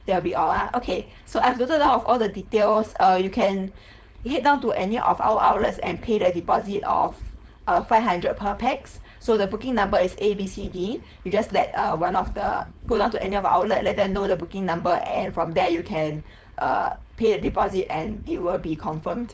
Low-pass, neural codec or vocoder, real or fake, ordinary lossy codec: none; codec, 16 kHz, 4.8 kbps, FACodec; fake; none